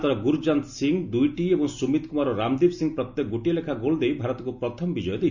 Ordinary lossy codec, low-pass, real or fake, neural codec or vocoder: none; 7.2 kHz; real; none